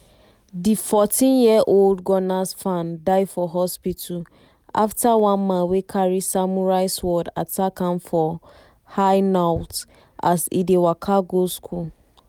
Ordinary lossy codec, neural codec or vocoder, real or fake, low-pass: none; none; real; none